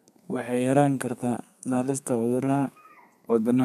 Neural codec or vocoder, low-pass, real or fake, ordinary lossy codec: codec, 32 kHz, 1.9 kbps, SNAC; 14.4 kHz; fake; none